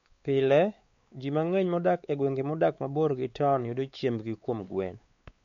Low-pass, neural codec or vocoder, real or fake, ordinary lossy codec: 7.2 kHz; codec, 16 kHz, 4 kbps, X-Codec, WavLM features, trained on Multilingual LibriSpeech; fake; MP3, 48 kbps